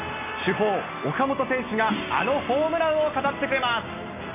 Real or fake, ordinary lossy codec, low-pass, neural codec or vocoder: real; AAC, 32 kbps; 3.6 kHz; none